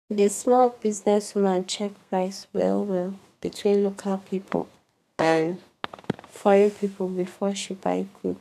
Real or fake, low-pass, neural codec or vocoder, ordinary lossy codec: fake; 14.4 kHz; codec, 32 kHz, 1.9 kbps, SNAC; none